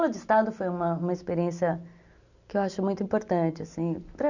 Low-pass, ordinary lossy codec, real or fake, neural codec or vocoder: 7.2 kHz; none; real; none